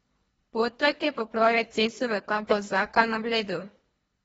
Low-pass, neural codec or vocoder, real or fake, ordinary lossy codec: 10.8 kHz; codec, 24 kHz, 1.5 kbps, HILCodec; fake; AAC, 24 kbps